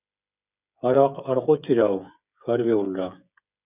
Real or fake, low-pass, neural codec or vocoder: fake; 3.6 kHz; codec, 16 kHz, 8 kbps, FreqCodec, smaller model